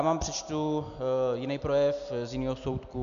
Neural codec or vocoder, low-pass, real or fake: none; 7.2 kHz; real